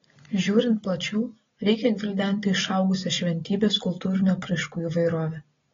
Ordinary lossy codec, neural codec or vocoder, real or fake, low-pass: AAC, 24 kbps; none; real; 7.2 kHz